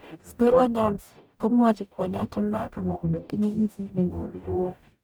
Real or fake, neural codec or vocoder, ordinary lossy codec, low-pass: fake; codec, 44.1 kHz, 0.9 kbps, DAC; none; none